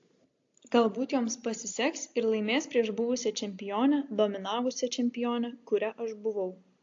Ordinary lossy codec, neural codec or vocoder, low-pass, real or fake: AAC, 48 kbps; none; 7.2 kHz; real